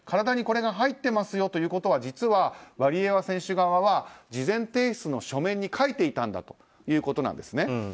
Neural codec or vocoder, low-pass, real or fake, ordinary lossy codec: none; none; real; none